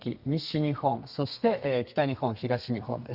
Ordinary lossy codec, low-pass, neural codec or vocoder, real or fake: none; 5.4 kHz; codec, 32 kHz, 1.9 kbps, SNAC; fake